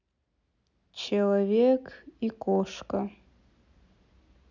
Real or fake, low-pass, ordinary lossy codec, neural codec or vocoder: real; 7.2 kHz; none; none